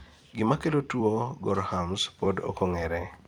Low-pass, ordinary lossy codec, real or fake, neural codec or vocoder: 19.8 kHz; none; fake; vocoder, 44.1 kHz, 128 mel bands every 256 samples, BigVGAN v2